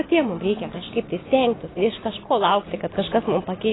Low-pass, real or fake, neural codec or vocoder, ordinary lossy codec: 7.2 kHz; real; none; AAC, 16 kbps